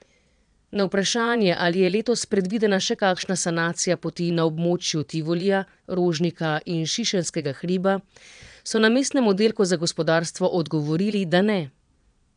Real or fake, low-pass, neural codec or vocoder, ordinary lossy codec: fake; 9.9 kHz; vocoder, 22.05 kHz, 80 mel bands, Vocos; none